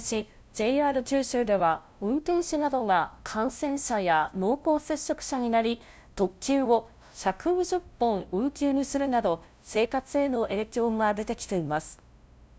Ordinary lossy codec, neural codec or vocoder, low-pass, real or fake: none; codec, 16 kHz, 0.5 kbps, FunCodec, trained on LibriTTS, 25 frames a second; none; fake